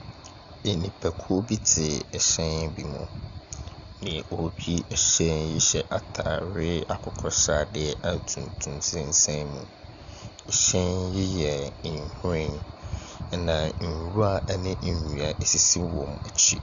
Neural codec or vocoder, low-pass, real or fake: none; 7.2 kHz; real